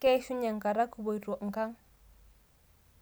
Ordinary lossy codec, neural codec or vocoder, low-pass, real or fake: none; none; none; real